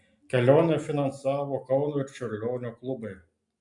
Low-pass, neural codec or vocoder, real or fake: 10.8 kHz; none; real